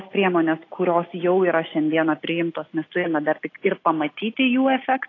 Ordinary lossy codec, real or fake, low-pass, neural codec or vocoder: AAC, 32 kbps; real; 7.2 kHz; none